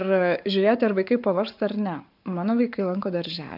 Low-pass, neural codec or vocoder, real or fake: 5.4 kHz; codec, 44.1 kHz, 7.8 kbps, Pupu-Codec; fake